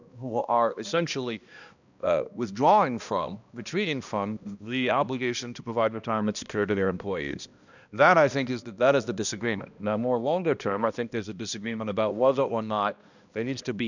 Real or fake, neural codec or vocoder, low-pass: fake; codec, 16 kHz, 1 kbps, X-Codec, HuBERT features, trained on balanced general audio; 7.2 kHz